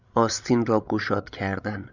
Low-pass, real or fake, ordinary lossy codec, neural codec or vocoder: 7.2 kHz; fake; Opus, 64 kbps; codec, 16 kHz, 16 kbps, FreqCodec, larger model